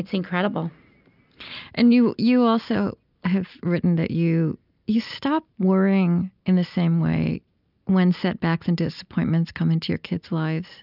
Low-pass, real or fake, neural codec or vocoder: 5.4 kHz; real; none